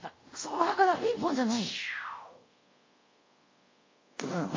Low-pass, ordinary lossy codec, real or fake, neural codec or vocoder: 7.2 kHz; MP3, 32 kbps; fake; codec, 24 kHz, 0.5 kbps, DualCodec